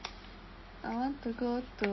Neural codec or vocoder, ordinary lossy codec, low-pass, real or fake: none; MP3, 24 kbps; 7.2 kHz; real